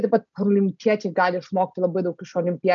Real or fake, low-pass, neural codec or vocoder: real; 7.2 kHz; none